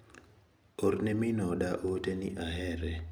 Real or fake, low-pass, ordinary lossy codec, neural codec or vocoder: real; none; none; none